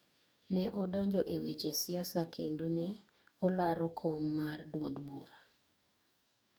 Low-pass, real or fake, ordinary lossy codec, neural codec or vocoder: none; fake; none; codec, 44.1 kHz, 2.6 kbps, DAC